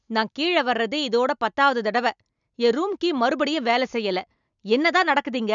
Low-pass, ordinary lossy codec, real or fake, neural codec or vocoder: 7.2 kHz; none; real; none